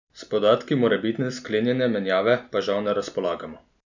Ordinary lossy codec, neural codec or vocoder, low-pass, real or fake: none; none; 7.2 kHz; real